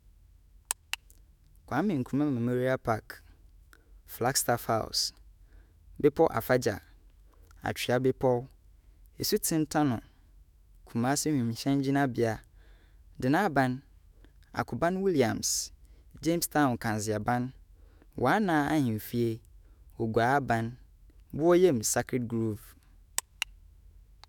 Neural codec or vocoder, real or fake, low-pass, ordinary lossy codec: autoencoder, 48 kHz, 128 numbers a frame, DAC-VAE, trained on Japanese speech; fake; 19.8 kHz; none